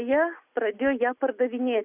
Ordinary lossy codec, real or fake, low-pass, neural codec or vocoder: Opus, 64 kbps; real; 3.6 kHz; none